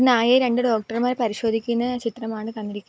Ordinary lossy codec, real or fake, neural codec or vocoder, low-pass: none; real; none; none